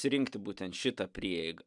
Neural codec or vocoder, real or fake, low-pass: none; real; 10.8 kHz